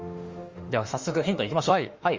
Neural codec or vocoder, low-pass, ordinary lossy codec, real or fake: autoencoder, 48 kHz, 32 numbers a frame, DAC-VAE, trained on Japanese speech; 7.2 kHz; Opus, 32 kbps; fake